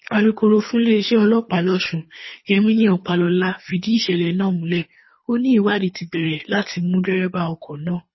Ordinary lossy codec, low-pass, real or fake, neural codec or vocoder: MP3, 24 kbps; 7.2 kHz; fake; codec, 24 kHz, 3 kbps, HILCodec